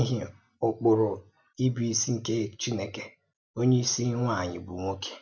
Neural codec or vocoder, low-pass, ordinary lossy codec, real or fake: none; none; none; real